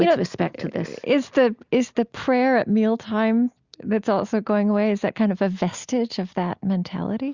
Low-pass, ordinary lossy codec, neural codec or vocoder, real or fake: 7.2 kHz; Opus, 64 kbps; none; real